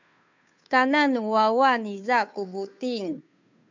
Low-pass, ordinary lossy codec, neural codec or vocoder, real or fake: 7.2 kHz; MP3, 64 kbps; codec, 16 kHz, 2 kbps, FunCodec, trained on Chinese and English, 25 frames a second; fake